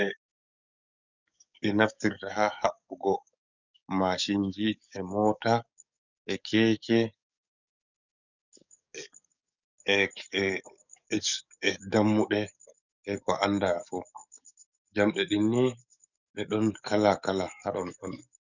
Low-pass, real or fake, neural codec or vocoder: 7.2 kHz; fake; codec, 44.1 kHz, 7.8 kbps, DAC